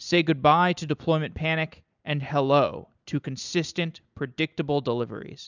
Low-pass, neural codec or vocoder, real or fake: 7.2 kHz; vocoder, 44.1 kHz, 128 mel bands every 256 samples, BigVGAN v2; fake